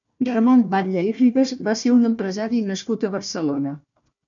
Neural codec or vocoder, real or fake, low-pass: codec, 16 kHz, 1 kbps, FunCodec, trained on Chinese and English, 50 frames a second; fake; 7.2 kHz